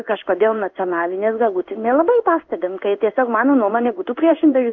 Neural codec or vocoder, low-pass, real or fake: codec, 16 kHz in and 24 kHz out, 1 kbps, XY-Tokenizer; 7.2 kHz; fake